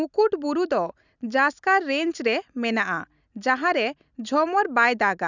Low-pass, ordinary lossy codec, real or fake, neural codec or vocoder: 7.2 kHz; none; real; none